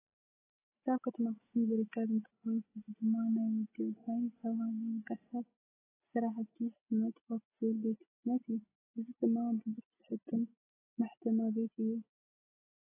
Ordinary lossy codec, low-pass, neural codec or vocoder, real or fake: AAC, 16 kbps; 3.6 kHz; none; real